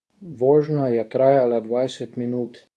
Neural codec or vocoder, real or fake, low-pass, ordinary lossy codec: codec, 24 kHz, 0.9 kbps, WavTokenizer, medium speech release version 2; fake; none; none